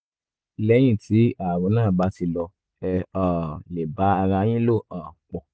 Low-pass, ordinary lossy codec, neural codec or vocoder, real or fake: none; none; none; real